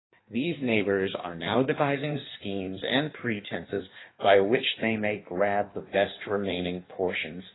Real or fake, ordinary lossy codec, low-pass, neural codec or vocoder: fake; AAC, 16 kbps; 7.2 kHz; codec, 16 kHz in and 24 kHz out, 1.1 kbps, FireRedTTS-2 codec